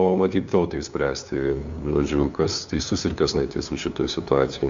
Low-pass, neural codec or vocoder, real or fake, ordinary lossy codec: 7.2 kHz; codec, 16 kHz, 2 kbps, FunCodec, trained on LibriTTS, 25 frames a second; fake; AAC, 64 kbps